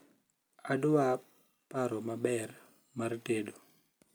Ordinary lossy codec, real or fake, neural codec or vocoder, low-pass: none; real; none; none